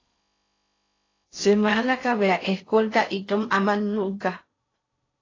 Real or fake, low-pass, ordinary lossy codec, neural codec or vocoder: fake; 7.2 kHz; AAC, 32 kbps; codec, 16 kHz in and 24 kHz out, 0.6 kbps, FocalCodec, streaming, 4096 codes